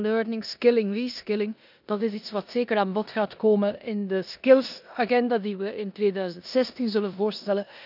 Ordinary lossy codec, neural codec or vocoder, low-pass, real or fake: none; codec, 16 kHz in and 24 kHz out, 0.9 kbps, LongCat-Audio-Codec, four codebook decoder; 5.4 kHz; fake